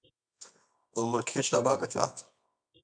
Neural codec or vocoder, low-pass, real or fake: codec, 24 kHz, 0.9 kbps, WavTokenizer, medium music audio release; 9.9 kHz; fake